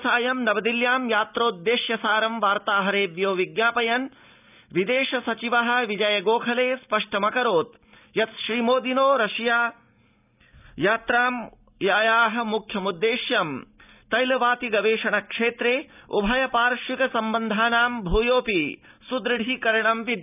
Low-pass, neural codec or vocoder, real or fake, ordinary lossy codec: 3.6 kHz; none; real; none